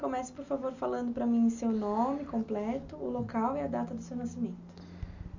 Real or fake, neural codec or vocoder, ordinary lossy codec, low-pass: real; none; none; 7.2 kHz